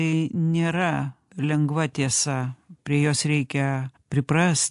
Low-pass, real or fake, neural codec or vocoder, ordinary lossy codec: 10.8 kHz; real; none; AAC, 64 kbps